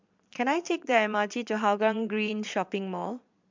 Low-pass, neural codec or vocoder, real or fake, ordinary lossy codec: 7.2 kHz; vocoder, 22.05 kHz, 80 mel bands, Vocos; fake; MP3, 64 kbps